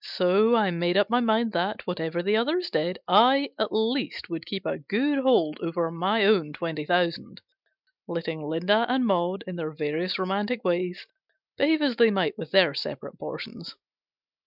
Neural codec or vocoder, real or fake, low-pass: none; real; 5.4 kHz